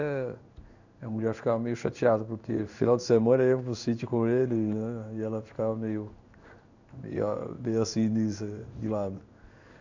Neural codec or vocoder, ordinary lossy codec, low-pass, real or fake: codec, 16 kHz in and 24 kHz out, 1 kbps, XY-Tokenizer; none; 7.2 kHz; fake